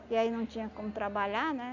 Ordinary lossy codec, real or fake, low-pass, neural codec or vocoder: none; real; 7.2 kHz; none